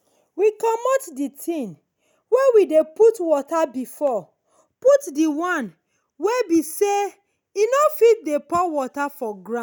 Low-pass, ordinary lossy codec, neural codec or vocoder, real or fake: none; none; none; real